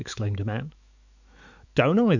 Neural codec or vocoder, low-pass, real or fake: autoencoder, 48 kHz, 128 numbers a frame, DAC-VAE, trained on Japanese speech; 7.2 kHz; fake